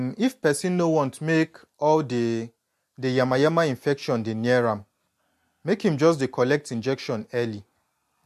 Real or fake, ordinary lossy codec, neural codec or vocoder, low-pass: real; MP3, 64 kbps; none; 14.4 kHz